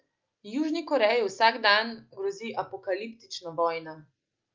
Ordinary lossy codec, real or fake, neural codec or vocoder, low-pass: Opus, 24 kbps; real; none; 7.2 kHz